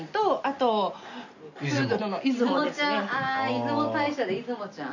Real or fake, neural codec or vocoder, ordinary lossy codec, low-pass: real; none; none; 7.2 kHz